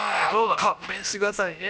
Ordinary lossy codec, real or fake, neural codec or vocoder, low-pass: none; fake; codec, 16 kHz, about 1 kbps, DyCAST, with the encoder's durations; none